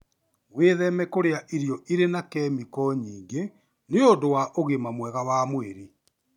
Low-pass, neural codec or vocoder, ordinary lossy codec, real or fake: 19.8 kHz; none; none; real